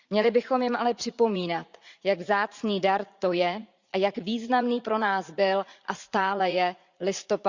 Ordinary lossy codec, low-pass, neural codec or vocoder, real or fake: Opus, 64 kbps; 7.2 kHz; vocoder, 44.1 kHz, 80 mel bands, Vocos; fake